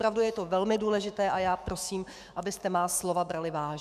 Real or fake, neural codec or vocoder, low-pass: fake; autoencoder, 48 kHz, 128 numbers a frame, DAC-VAE, trained on Japanese speech; 14.4 kHz